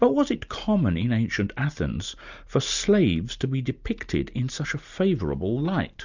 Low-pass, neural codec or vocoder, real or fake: 7.2 kHz; none; real